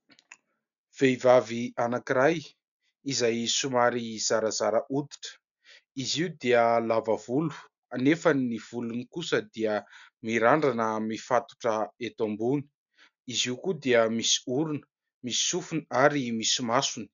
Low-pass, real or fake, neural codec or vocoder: 7.2 kHz; real; none